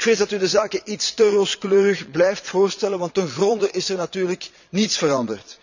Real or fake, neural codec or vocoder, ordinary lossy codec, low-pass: fake; vocoder, 22.05 kHz, 80 mel bands, Vocos; none; 7.2 kHz